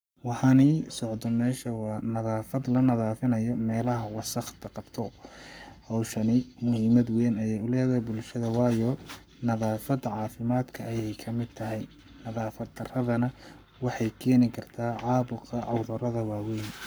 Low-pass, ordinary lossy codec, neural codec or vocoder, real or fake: none; none; codec, 44.1 kHz, 7.8 kbps, Pupu-Codec; fake